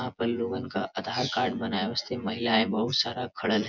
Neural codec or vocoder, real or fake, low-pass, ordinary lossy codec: vocoder, 24 kHz, 100 mel bands, Vocos; fake; 7.2 kHz; none